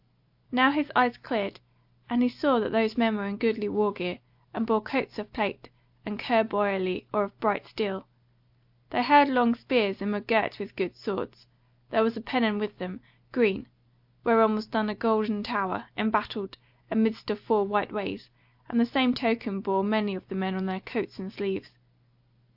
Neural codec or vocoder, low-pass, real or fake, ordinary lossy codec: none; 5.4 kHz; real; AAC, 48 kbps